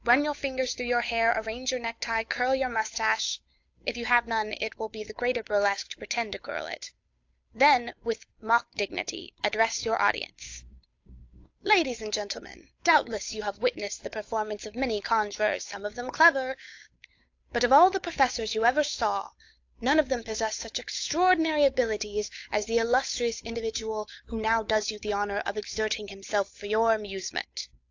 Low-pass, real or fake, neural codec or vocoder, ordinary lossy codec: 7.2 kHz; fake; codec, 16 kHz, 8 kbps, FunCodec, trained on Chinese and English, 25 frames a second; AAC, 48 kbps